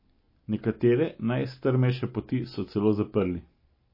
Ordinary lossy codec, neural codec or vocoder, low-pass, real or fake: MP3, 24 kbps; none; 5.4 kHz; real